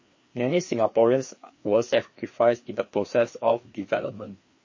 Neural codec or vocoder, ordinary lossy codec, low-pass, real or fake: codec, 16 kHz, 2 kbps, FreqCodec, larger model; MP3, 32 kbps; 7.2 kHz; fake